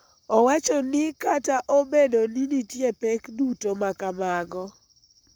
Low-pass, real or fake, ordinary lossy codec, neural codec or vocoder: none; fake; none; codec, 44.1 kHz, 7.8 kbps, Pupu-Codec